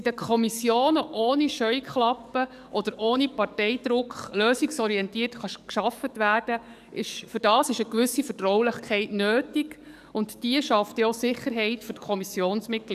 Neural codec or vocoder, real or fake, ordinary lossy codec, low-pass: codec, 44.1 kHz, 7.8 kbps, DAC; fake; none; 14.4 kHz